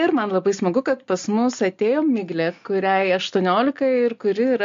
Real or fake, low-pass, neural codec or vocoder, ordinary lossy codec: real; 7.2 kHz; none; MP3, 48 kbps